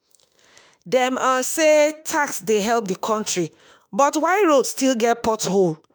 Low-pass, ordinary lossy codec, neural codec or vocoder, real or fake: none; none; autoencoder, 48 kHz, 32 numbers a frame, DAC-VAE, trained on Japanese speech; fake